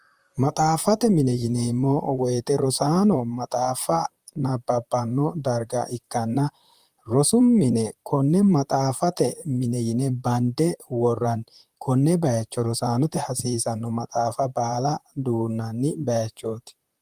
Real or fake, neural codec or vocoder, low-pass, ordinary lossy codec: fake; vocoder, 44.1 kHz, 128 mel bands, Pupu-Vocoder; 14.4 kHz; Opus, 32 kbps